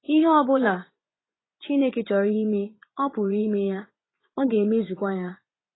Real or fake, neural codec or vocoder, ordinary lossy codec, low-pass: fake; vocoder, 24 kHz, 100 mel bands, Vocos; AAC, 16 kbps; 7.2 kHz